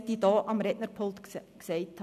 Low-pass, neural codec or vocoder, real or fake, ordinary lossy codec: 14.4 kHz; none; real; none